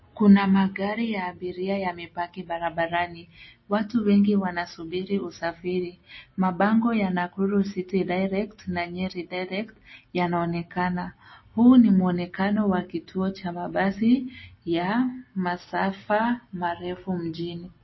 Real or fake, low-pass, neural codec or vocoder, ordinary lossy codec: real; 7.2 kHz; none; MP3, 24 kbps